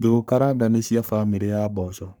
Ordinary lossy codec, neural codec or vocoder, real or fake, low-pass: none; codec, 44.1 kHz, 3.4 kbps, Pupu-Codec; fake; none